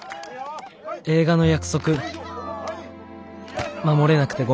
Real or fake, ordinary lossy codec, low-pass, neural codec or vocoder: real; none; none; none